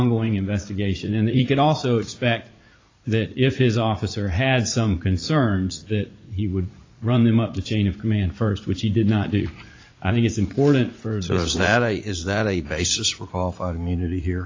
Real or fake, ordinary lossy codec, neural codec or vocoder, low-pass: fake; AAC, 32 kbps; vocoder, 44.1 kHz, 80 mel bands, Vocos; 7.2 kHz